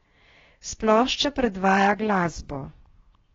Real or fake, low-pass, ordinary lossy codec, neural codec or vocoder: fake; 7.2 kHz; AAC, 24 kbps; codec, 16 kHz, 0.7 kbps, FocalCodec